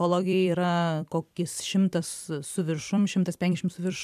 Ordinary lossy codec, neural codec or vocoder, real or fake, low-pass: MP3, 96 kbps; vocoder, 44.1 kHz, 128 mel bands every 256 samples, BigVGAN v2; fake; 14.4 kHz